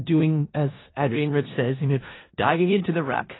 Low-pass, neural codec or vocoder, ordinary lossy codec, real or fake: 7.2 kHz; codec, 16 kHz in and 24 kHz out, 0.4 kbps, LongCat-Audio-Codec, four codebook decoder; AAC, 16 kbps; fake